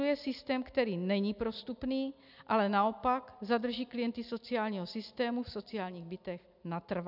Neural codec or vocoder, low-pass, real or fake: none; 5.4 kHz; real